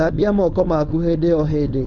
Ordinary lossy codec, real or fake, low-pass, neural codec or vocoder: none; fake; 7.2 kHz; codec, 16 kHz, 4.8 kbps, FACodec